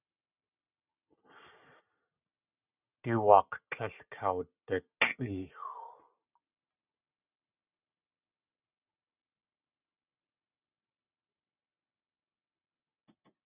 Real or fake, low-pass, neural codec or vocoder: real; 3.6 kHz; none